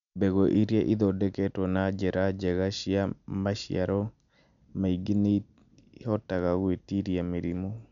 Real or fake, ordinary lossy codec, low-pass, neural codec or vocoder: real; none; 7.2 kHz; none